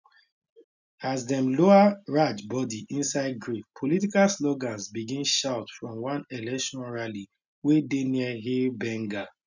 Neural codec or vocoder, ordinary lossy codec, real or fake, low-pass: none; none; real; 7.2 kHz